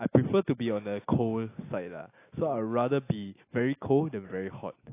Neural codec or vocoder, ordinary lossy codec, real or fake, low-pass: none; AAC, 24 kbps; real; 3.6 kHz